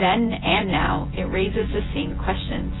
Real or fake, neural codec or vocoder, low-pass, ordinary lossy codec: fake; vocoder, 24 kHz, 100 mel bands, Vocos; 7.2 kHz; AAC, 16 kbps